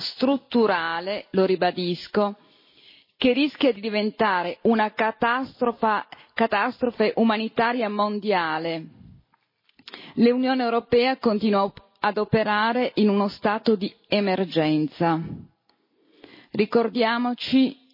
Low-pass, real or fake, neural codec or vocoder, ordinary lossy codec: 5.4 kHz; real; none; MP3, 24 kbps